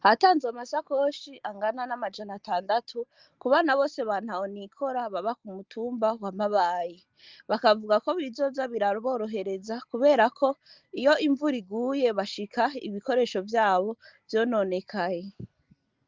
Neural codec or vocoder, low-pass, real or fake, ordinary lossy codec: none; 7.2 kHz; real; Opus, 32 kbps